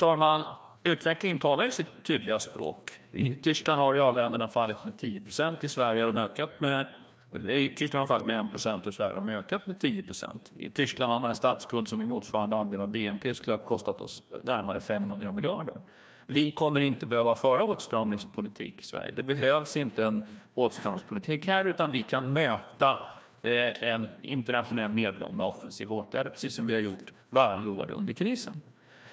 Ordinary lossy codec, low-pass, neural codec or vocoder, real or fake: none; none; codec, 16 kHz, 1 kbps, FreqCodec, larger model; fake